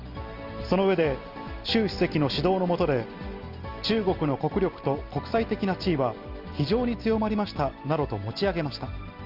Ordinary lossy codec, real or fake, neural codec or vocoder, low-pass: Opus, 24 kbps; real; none; 5.4 kHz